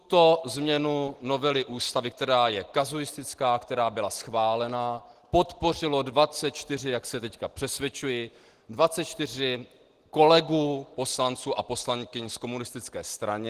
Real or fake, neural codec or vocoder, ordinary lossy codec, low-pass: real; none; Opus, 16 kbps; 14.4 kHz